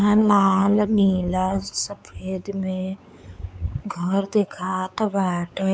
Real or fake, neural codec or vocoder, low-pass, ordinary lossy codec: fake; codec, 16 kHz, 4 kbps, X-Codec, WavLM features, trained on Multilingual LibriSpeech; none; none